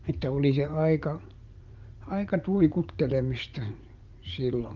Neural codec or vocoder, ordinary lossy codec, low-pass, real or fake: vocoder, 44.1 kHz, 80 mel bands, Vocos; Opus, 32 kbps; 7.2 kHz; fake